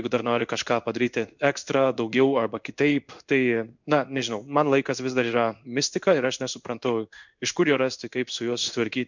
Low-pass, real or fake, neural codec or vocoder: 7.2 kHz; fake; codec, 16 kHz in and 24 kHz out, 1 kbps, XY-Tokenizer